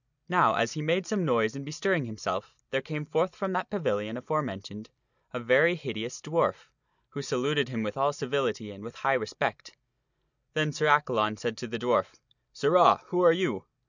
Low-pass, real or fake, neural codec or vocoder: 7.2 kHz; real; none